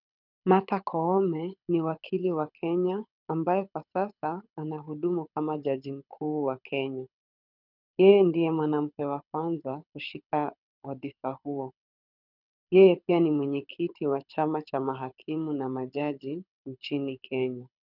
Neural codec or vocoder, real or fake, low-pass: codec, 24 kHz, 6 kbps, HILCodec; fake; 5.4 kHz